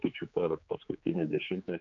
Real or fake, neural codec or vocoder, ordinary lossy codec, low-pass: fake; codec, 16 kHz, 4 kbps, X-Codec, HuBERT features, trained on general audio; MP3, 96 kbps; 7.2 kHz